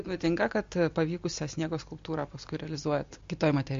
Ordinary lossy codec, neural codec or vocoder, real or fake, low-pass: MP3, 48 kbps; none; real; 7.2 kHz